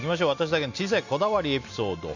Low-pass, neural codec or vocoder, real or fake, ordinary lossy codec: 7.2 kHz; none; real; none